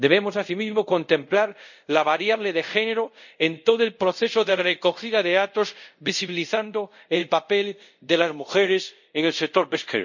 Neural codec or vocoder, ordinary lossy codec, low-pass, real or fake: codec, 24 kHz, 0.5 kbps, DualCodec; none; 7.2 kHz; fake